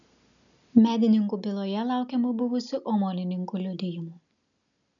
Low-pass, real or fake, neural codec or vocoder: 7.2 kHz; real; none